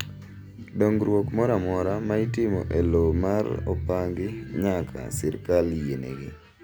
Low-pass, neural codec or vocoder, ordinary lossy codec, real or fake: none; none; none; real